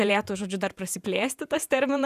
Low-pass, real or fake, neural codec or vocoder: 14.4 kHz; fake; vocoder, 48 kHz, 128 mel bands, Vocos